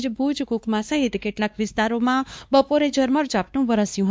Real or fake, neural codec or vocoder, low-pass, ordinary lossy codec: fake; codec, 16 kHz, 2 kbps, X-Codec, WavLM features, trained on Multilingual LibriSpeech; none; none